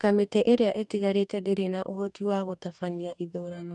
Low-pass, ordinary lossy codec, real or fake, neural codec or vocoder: 10.8 kHz; none; fake; codec, 44.1 kHz, 2.6 kbps, DAC